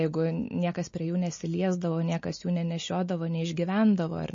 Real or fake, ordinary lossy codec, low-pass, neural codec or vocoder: real; MP3, 32 kbps; 7.2 kHz; none